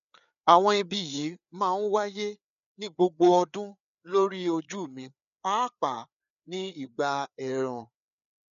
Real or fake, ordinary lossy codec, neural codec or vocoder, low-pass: fake; none; codec, 16 kHz, 4 kbps, FreqCodec, larger model; 7.2 kHz